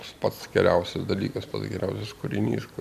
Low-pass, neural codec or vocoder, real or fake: 14.4 kHz; none; real